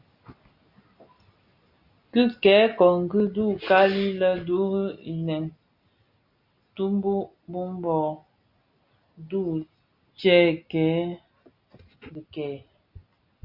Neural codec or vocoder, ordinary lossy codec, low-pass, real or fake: none; Opus, 64 kbps; 5.4 kHz; real